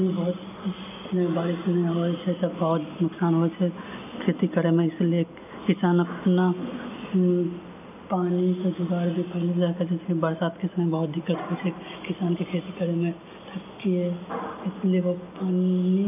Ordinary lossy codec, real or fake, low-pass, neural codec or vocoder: none; real; 3.6 kHz; none